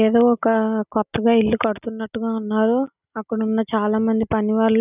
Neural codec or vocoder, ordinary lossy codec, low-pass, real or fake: none; none; 3.6 kHz; real